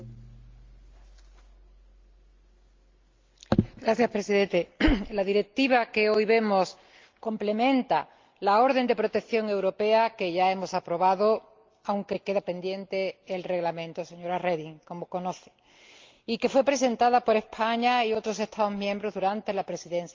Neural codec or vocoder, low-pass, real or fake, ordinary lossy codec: none; 7.2 kHz; real; Opus, 32 kbps